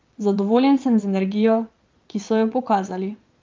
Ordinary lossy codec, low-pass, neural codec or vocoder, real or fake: Opus, 32 kbps; 7.2 kHz; vocoder, 22.05 kHz, 80 mel bands, Vocos; fake